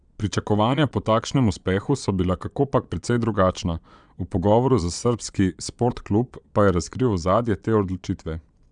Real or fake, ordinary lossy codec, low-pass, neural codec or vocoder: fake; none; 9.9 kHz; vocoder, 22.05 kHz, 80 mel bands, Vocos